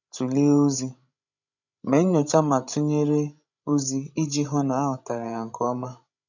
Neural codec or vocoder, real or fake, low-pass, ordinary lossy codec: codec, 16 kHz, 16 kbps, FreqCodec, larger model; fake; 7.2 kHz; none